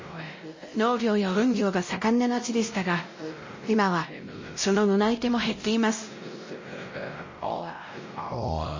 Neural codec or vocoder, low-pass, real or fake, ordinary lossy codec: codec, 16 kHz, 0.5 kbps, X-Codec, WavLM features, trained on Multilingual LibriSpeech; 7.2 kHz; fake; MP3, 32 kbps